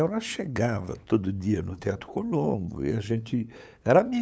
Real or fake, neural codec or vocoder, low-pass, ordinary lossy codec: fake; codec, 16 kHz, 8 kbps, FunCodec, trained on LibriTTS, 25 frames a second; none; none